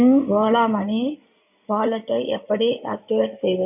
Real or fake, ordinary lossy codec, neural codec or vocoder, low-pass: fake; none; codec, 16 kHz in and 24 kHz out, 2.2 kbps, FireRedTTS-2 codec; 3.6 kHz